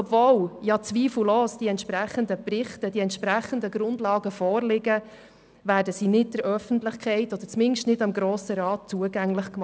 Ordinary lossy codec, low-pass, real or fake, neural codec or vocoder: none; none; real; none